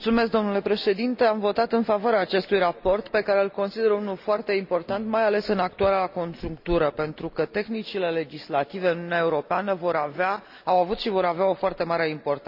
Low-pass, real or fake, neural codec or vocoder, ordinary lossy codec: 5.4 kHz; real; none; none